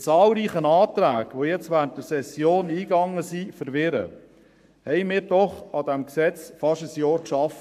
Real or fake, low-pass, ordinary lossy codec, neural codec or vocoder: fake; 14.4 kHz; AAC, 96 kbps; codec, 44.1 kHz, 7.8 kbps, Pupu-Codec